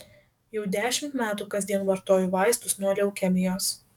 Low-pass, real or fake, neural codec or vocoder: 19.8 kHz; fake; codec, 44.1 kHz, 7.8 kbps, DAC